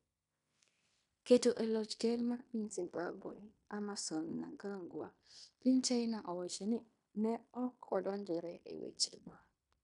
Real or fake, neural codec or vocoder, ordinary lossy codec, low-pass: fake; codec, 16 kHz in and 24 kHz out, 0.9 kbps, LongCat-Audio-Codec, fine tuned four codebook decoder; none; 10.8 kHz